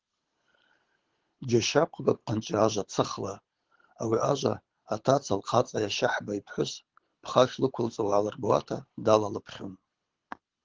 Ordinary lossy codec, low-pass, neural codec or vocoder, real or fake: Opus, 16 kbps; 7.2 kHz; codec, 24 kHz, 6 kbps, HILCodec; fake